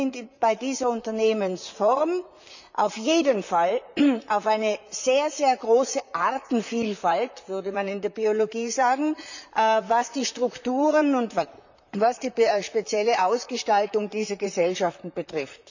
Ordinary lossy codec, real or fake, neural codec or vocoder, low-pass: none; fake; vocoder, 44.1 kHz, 128 mel bands, Pupu-Vocoder; 7.2 kHz